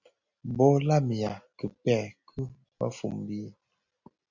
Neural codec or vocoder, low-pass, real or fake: none; 7.2 kHz; real